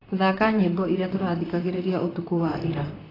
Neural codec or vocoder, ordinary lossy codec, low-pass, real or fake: vocoder, 44.1 kHz, 128 mel bands, Pupu-Vocoder; AAC, 24 kbps; 5.4 kHz; fake